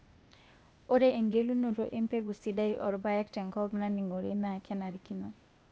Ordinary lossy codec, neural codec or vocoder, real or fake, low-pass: none; codec, 16 kHz, 0.8 kbps, ZipCodec; fake; none